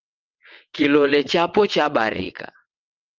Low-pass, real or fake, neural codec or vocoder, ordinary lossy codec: 7.2 kHz; fake; vocoder, 22.05 kHz, 80 mel bands, WaveNeXt; Opus, 24 kbps